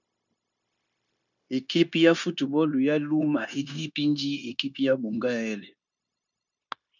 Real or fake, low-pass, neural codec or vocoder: fake; 7.2 kHz; codec, 16 kHz, 0.9 kbps, LongCat-Audio-Codec